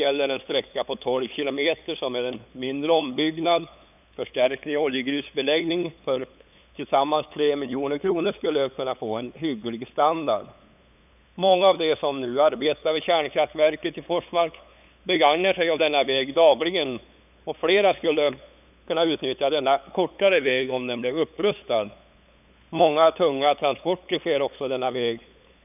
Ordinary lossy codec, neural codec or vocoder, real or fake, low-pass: none; codec, 16 kHz, 8 kbps, FunCodec, trained on LibriTTS, 25 frames a second; fake; 3.6 kHz